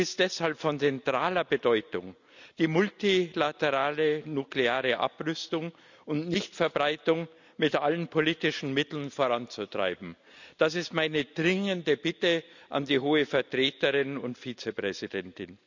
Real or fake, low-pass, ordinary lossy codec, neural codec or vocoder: real; 7.2 kHz; none; none